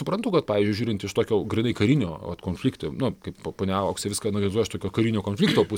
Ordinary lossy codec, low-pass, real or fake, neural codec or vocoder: MP3, 96 kbps; 19.8 kHz; real; none